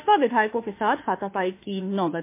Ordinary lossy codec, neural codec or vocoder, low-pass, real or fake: MP3, 24 kbps; autoencoder, 48 kHz, 32 numbers a frame, DAC-VAE, trained on Japanese speech; 3.6 kHz; fake